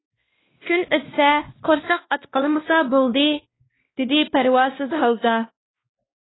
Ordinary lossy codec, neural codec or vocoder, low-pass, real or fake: AAC, 16 kbps; codec, 16 kHz, 1 kbps, X-Codec, WavLM features, trained on Multilingual LibriSpeech; 7.2 kHz; fake